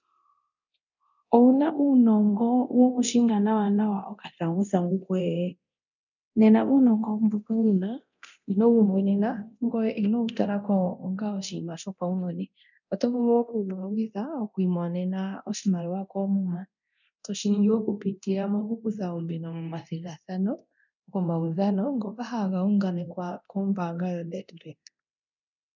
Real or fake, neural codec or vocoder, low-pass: fake; codec, 24 kHz, 0.9 kbps, DualCodec; 7.2 kHz